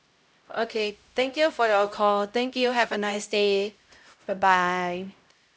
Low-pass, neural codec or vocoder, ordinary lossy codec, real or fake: none; codec, 16 kHz, 0.5 kbps, X-Codec, HuBERT features, trained on LibriSpeech; none; fake